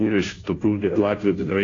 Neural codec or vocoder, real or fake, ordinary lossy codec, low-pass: codec, 16 kHz, 0.5 kbps, FunCodec, trained on Chinese and English, 25 frames a second; fake; AAC, 32 kbps; 7.2 kHz